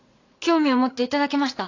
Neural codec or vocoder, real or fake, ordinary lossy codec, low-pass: vocoder, 44.1 kHz, 80 mel bands, Vocos; fake; AAC, 48 kbps; 7.2 kHz